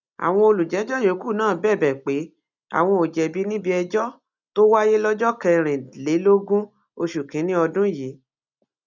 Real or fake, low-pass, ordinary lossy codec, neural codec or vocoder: real; 7.2 kHz; none; none